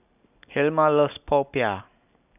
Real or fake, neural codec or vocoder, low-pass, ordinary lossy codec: real; none; 3.6 kHz; none